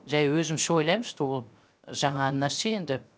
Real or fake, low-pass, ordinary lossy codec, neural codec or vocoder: fake; none; none; codec, 16 kHz, 0.7 kbps, FocalCodec